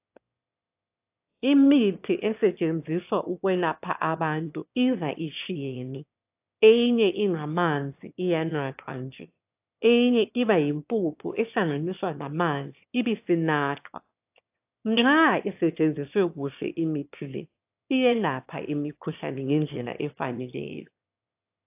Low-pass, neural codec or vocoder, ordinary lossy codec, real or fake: 3.6 kHz; autoencoder, 22.05 kHz, a latent of 192 numbers a frame, VITS, trained on one speaker; AAC, 32 kbps; fake